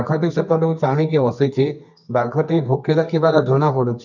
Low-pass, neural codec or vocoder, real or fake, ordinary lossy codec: 7.2 kHz; codec, 24 kHz, 0.9 kbps, WavTokenizer, medium music audio release; fake; none